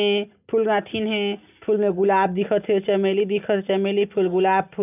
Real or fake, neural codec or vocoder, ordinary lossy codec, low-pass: real; none; none; 3.6 kHz